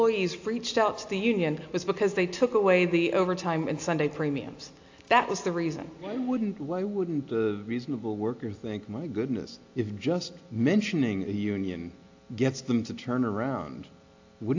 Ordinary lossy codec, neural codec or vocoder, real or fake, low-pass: AAC, 48 kbps; none; real; 7.2 kHz